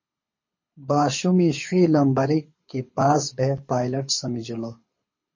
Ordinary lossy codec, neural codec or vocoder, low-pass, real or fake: MP3, 32 kbps; codec, 24 kHz, 6 kbps, HILCodec; 7.2 kHz; fake